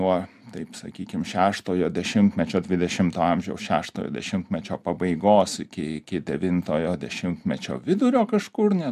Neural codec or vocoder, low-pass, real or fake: none; 14.4 kHz; real